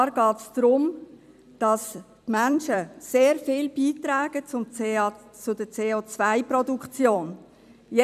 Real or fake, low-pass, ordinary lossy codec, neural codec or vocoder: fake; 14.4 kHz; none; vocoder, 44.1 kHz, 128 mel bands every 256 samples, BigVGAN v2